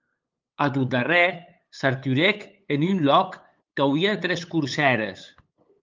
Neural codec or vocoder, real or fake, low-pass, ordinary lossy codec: codec, 16 kHz, 8 kbps, FunCodec, trained on LibriTTS, 25 frames a second; fake; 7.2 kHz; Opus, 24 kbps